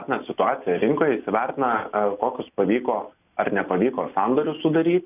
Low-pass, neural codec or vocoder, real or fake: 3.6 kHz; none; real